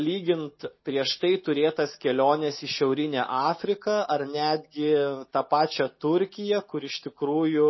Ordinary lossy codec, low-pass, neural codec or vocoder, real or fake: MP3, 24 kbps; 7.2 kHz; none; real